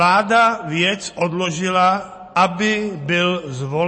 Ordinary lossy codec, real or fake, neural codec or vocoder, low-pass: MP3, 32 kbps; real; none; 9.9 kHz